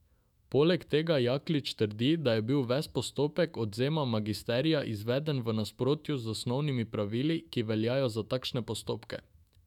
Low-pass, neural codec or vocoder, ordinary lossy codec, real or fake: 19.8 kHz; autoencoder, 48 kHz, 128 numbers a frame, DAC-VAE, trained on Japanese speech; none; fake